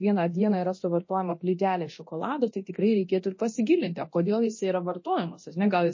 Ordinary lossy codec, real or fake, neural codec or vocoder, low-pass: MP3, 32 kbps; fake; codec, 24 kHz, 0.9 kbps, DualCodec; 7.2 kHz